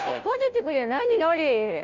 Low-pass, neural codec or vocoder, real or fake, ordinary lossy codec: 7.2 kHz; codec, 16 kHz, 0.5 kbps, FunCodec, trained on Chinese and English, 25 frames a second; fake; none